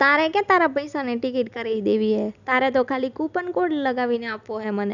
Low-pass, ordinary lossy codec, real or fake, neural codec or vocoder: 7.2 kHz; none; real; none